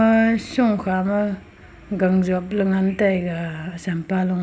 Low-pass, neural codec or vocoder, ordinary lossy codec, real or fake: none; none; none; real